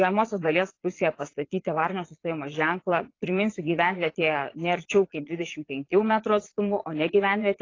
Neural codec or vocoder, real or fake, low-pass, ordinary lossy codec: vocoder, 22.05 kHz, 80 mel bands, Vocos; fake; 7.2 kHz; AAC, 32 kbps